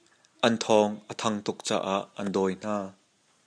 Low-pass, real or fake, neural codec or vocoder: 9.9 kHz; real; none